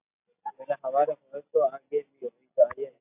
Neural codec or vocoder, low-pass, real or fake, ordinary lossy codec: none; 3.6 kHz; real; AAC, 24 kbps